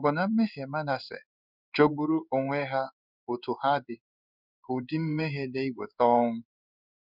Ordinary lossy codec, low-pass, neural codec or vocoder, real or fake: none; 5.4 kHz; codec, 16 kHz in and 24 kHz out, 1 kbps, XY-Tokenizer; fake